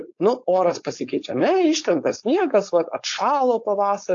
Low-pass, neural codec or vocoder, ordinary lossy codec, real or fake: 7.2 kHz; codec, 16 kHz, 4.8 kbps, FACodec; AAC, 48 kbps; fake